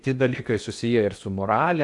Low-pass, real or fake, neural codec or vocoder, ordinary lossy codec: 10.8 kHz; fake; codec, 16 kHz in and 24 kHz out, 0.8 kbps, FocalCodec, streaming, 65536 codes; AAC, 64 kbps